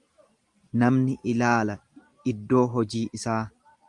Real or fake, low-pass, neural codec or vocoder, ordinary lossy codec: real; 10.8 kHz; none; Opus, 32 kbps